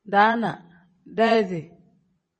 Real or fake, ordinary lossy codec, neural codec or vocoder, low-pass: fake; MP3, 32 kbps; vocoder, 22.05 kHz, 80 mel bands, Vocos; 9.9 kHz